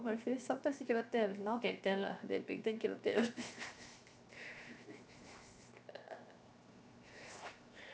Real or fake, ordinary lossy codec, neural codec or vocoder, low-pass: fake; none; codec, 16 kHz, 0.7 kbps, FocalCodec; none